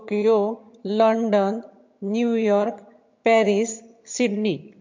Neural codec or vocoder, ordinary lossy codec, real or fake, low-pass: vocoder, 22.05 kHz, 80 mel bands, HiFi-GAN; MP3, 48 kbps; fake; 7.2 kHz